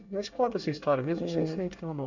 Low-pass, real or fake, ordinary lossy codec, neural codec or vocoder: 7.2 kHz; fake; none; codec, 24 kHz, 1 kbps, SNAC